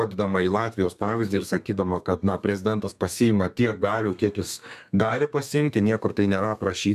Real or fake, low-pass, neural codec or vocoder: fake; 14.4 kHz; codec, 32 kHz, 1.9 kbps, SNAC